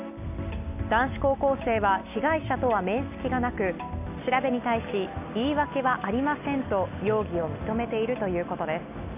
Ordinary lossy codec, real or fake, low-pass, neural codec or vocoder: none; real; 3.6 kHz; none